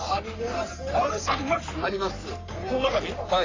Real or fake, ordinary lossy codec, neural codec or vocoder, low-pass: fake; AAC, 32 kbps; codec, 44.1 kHz, 3.4 kbps, Pupu-Codec; 7.2 kHz